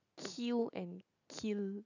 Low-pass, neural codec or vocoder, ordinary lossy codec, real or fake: 7.2 kHz; none; none; real